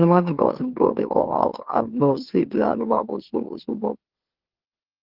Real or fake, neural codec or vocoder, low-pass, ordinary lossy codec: fake; autoencoder, 44.1 kHz, a latent of 192 numbers a frame, MeloTTS; 5.4 kHz; Opus, 16 kbps